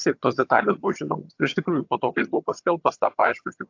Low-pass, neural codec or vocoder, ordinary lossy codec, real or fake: 7.2 kHz; vocoder, 22.05 kHz, 80 mel bands, HiFi-GAN; AAC, 48 kbps; fake